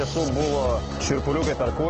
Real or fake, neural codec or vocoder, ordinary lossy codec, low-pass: real; none; Opus, 16 kbps; 7.2 kHz